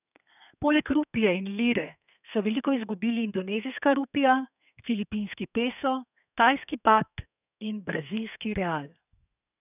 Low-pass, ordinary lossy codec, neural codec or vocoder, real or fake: 3.6 kHz; none; codec, 32 kHz, 1.9 kbps, SNAC; fake